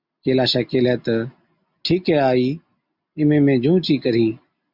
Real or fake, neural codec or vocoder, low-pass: real; none; 5.4 kHz